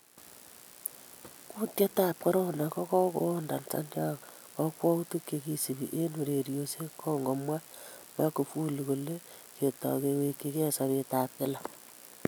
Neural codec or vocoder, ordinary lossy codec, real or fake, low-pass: none; none; real; none